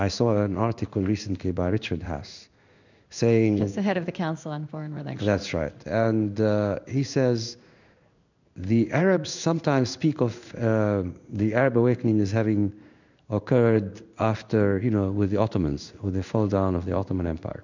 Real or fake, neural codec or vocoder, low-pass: fake; codec, 16 kHz in and 24 kHz out, 1 kbps, XY-Tokenizer; 7.2 kHz